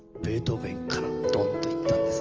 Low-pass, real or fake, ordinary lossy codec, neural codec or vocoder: 7.2 kHz; real; Opus, 24 kbps; none